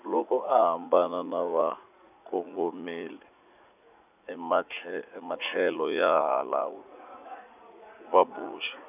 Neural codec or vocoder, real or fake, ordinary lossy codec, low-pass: vocoder, 44.1 kHz, 80 mel bands, Vocos; fake; none; 3.6 kHz